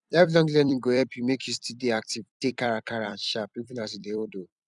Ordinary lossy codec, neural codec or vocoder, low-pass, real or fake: none; vocoder, 44.1 kHz, 128 mel bands every 256 samples, BigVGAN v2; 10.8 kHz; fake